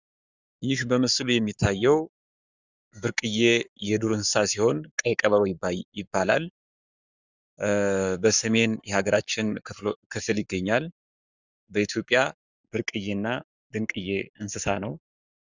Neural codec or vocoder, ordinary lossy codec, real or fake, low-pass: codec, 44.1 kHz, 7.8 kbps, Pupu-Codec; Opus, 64 kbps; fake; 7.2 kHz